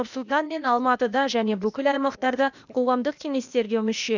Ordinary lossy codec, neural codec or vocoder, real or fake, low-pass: none; codec, 16 kHz, 0.8 kbps, ZipCodec; fake; 7.2 kHz